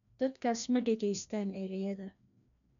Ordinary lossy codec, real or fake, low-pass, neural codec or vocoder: none; fake; 7.2 kHz; codec, 16 kHz, 1 kbps, FreqCodec, larger model